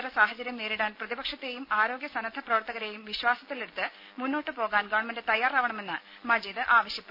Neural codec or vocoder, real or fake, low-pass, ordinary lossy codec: none; real; 5.4 kHz; AAC, 48 kbps